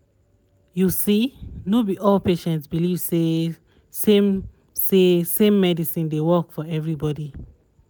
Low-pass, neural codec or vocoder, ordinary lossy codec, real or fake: none; none; none; real